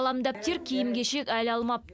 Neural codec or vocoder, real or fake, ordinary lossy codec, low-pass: none; real; none; none